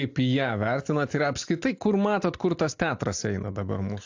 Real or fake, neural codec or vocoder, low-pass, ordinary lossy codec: real; none; 7.2 kHz; AAC, 48 kbps